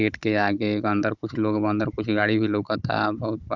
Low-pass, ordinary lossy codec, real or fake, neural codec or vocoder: 7.2 kHz; Opus, 64 kbps; fake; codec, 16 kHz, 16 kbps, FunCodec, trained on Chinese and English, 50 frames a second